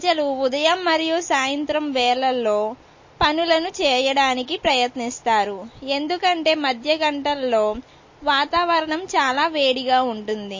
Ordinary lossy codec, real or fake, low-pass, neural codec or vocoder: MP3, 32 kbps; real; 7.2 kHz; none